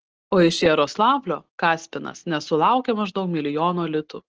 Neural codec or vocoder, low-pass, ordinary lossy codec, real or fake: none; 7.2 kHz; Opus, 16 kbps; real